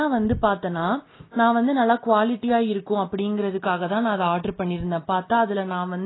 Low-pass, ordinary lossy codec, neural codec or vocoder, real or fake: 7.2 kHz; AAC, 16 kbps; none; real